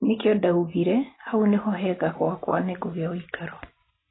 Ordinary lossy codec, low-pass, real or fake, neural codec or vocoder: AAC, 16 kbps; 7.2 kHz; real; none